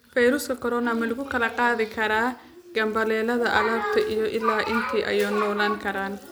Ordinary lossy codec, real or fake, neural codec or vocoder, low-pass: none; real; none; none